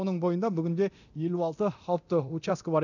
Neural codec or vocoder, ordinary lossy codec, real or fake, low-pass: codec, 24 kHz, 0.9 kbps, DualCodec; none; fake; 7.2 kHz